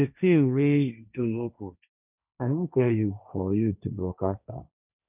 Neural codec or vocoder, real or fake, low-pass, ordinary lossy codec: codec, 16 kHz, 1.1 kbps, Voila-Tokenizer; fake; 3.6 kHz; none